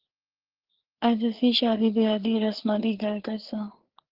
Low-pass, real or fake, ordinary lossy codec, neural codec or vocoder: 5.4 kHz; fake; Opus, 16 kbps; codec, 16 kHz, 4 kbps, FreqCodec, larger model